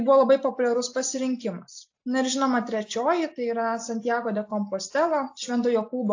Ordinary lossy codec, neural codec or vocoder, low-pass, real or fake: AAC, 48 kbps; none; 7.2 kHz; real